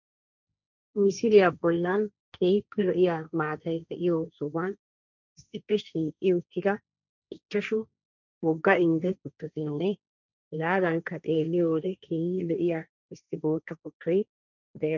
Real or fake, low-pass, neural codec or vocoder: fake; 7.2 kHz; codec, 16 kHz, 1.1 kbps, Voila-Tokenizer